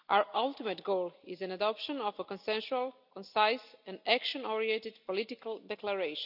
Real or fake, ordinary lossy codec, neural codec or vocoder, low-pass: real; none; none; 5.4 kHz